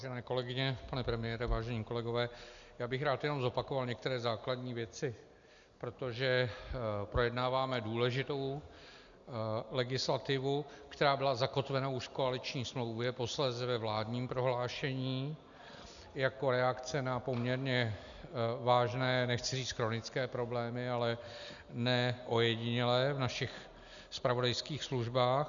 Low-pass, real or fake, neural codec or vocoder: 7.2 kHz; real; none